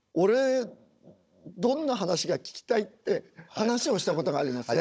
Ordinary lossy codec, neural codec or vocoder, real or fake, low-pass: none; codec, 16 kHz, 16 kbps, FunCodec, trained on Chinese and English, 50 frames a second; fake; none